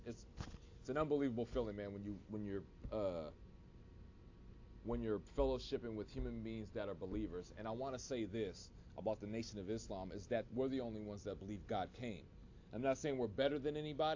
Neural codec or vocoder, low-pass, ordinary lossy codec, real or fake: none; 7.2 kHz; AAC, 48 kbps; real